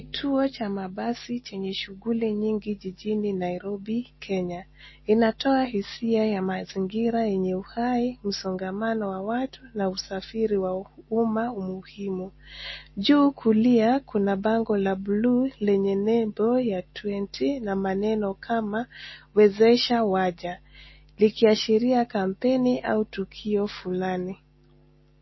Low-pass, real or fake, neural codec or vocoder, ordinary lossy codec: 7.2 kHz; real; none; MP3, 24 kbps